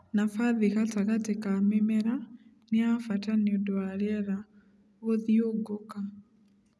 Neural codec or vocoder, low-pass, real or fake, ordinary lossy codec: none; none; real; none